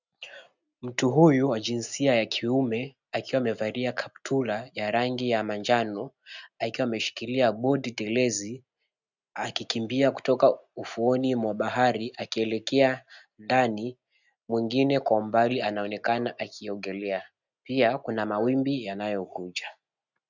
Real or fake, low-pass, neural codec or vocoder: real; 7.2 kHz; none